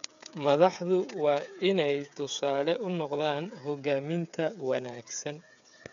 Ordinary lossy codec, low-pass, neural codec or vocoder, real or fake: MP3, 96 kbps; 7.2 kHz; codec, 16 kHz, 8 kbps, FreqCodec, smaller model; fake